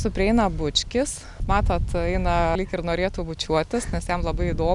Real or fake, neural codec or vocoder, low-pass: real; none; 10.8 kHz